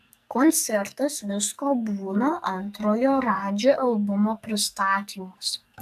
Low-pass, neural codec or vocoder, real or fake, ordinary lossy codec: 14.4 kHz; codec, 44.1 kHz, 2.6 kbps, SNAC; fake; AAC, 96 kbps